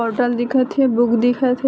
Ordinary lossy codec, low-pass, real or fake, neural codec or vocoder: none; none; real; none